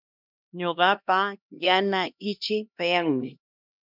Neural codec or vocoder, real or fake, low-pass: codec, 16 kHz, 1 kbps, X-Codec, HuBERT features, trained on LibriSpeech; fake; 5.4 kHz